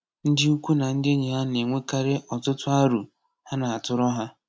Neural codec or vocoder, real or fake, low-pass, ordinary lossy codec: none; real; none; none